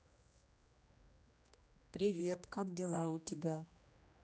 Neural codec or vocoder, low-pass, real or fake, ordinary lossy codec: codec, 16 kHz, 1 kbps, X-Codec, HuBERT features, trained on general audio; none; fake; none